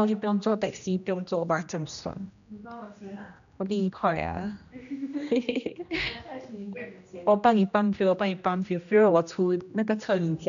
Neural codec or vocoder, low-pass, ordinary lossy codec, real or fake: codec, 16 kHz, 1 kbps, X-Codec, HuBERT features, trained on general audio; 7.2 kHz; none; fake